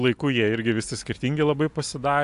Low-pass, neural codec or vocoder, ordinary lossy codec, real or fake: 10.8 kHz; none; AAC, 64 kbps; real